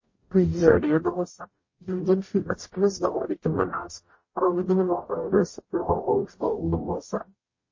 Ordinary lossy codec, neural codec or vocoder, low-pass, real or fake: MP3, 32 kbps; codec, 44.1 kHz, 0.9 kbps, DAC; 7.2 kHz; fake